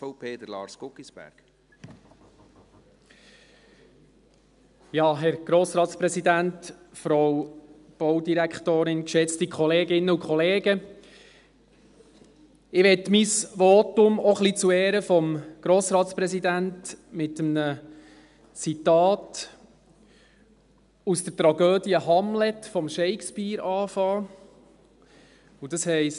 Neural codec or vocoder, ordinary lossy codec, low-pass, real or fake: none; none; 10.8 kHz; real